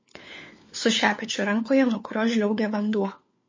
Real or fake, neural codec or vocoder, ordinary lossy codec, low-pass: fake; codec, 16 kHz, 4 kbps, FunCodec, trained on LibriTTS, 50 frames a second; MP3, 32 kbps; 7.2 kHz